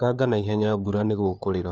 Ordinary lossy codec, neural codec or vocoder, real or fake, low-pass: none; codec, 16 kHz, 4 kbps, FunCodec, trained on LibriTTS, 50 frames a second; fake; none